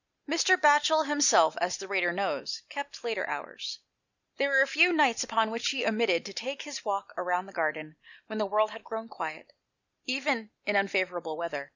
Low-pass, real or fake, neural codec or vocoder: 7.2 kHz; real; none